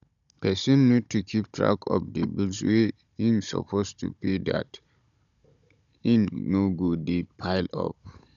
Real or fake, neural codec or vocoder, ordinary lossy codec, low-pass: fake; codec, 16 kHz, 16 kbps, FunCodec, trained on Chinese and English, 50 frames a second; none; 7.2 kHz